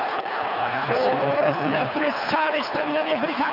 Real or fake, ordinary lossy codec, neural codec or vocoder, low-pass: fake; none; codec, 24 kHz, 3 kbps, HILCodec; 5.4 kHz